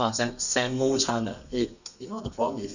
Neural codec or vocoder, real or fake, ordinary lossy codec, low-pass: codec, 32 kHz, 1.9 kbps, SNAC; fake; none; 7.2 kHz